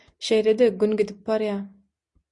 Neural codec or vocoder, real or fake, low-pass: none; real; 10.8 kHz